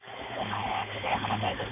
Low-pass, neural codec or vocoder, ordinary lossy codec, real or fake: 3.6 kHz; codec, 16 kHz, 4.8 kbps, FACodec; none; fake